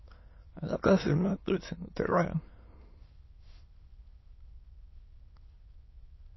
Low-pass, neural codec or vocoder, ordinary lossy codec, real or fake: 7.2 kHz; autoencoder, 22.05 kHz, a latent of 192 numbers a frame, VITS, trained on many speakers; MP3, 24 kbps; fake